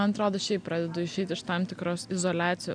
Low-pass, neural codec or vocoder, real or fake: 9.9 kHz; none; real